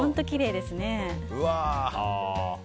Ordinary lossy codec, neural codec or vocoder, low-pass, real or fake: none; none; none; real